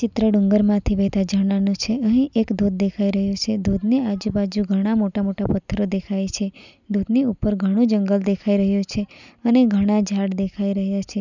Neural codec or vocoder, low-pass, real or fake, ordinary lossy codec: none; 7.2 kHz; real; none